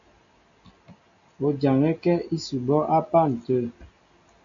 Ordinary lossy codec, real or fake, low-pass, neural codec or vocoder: AAC, 48 kbps; real; 7.2 kHz; none